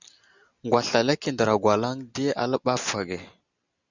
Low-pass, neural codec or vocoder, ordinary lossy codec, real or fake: 7.2 kHz; none; Opus, 64 kbps; real